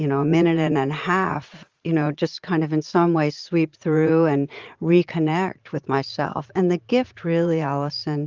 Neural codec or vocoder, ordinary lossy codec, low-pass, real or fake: vocoder, 44.1 kHz, 80 mel bands, Vocos; Opus, 32 kbps; 7.2 kHz; fake